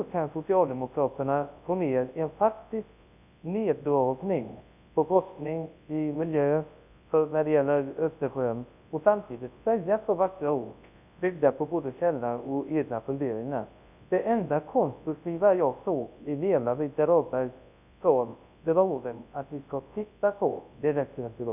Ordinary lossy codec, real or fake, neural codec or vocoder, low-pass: none; fake; codec, 24 kHz, 0.9 kbps, WavTokenizer, large speech release; 3.6 kHz